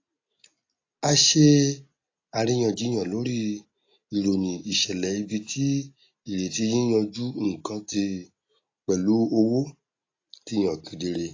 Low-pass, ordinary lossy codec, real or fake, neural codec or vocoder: 7.2 kHz; AAC, 32 kbps; real; none